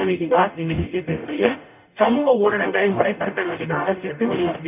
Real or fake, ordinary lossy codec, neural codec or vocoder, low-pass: fake; none; codec, 44.1 kHz, 0.9 kbps, DAC; 3.6 kHz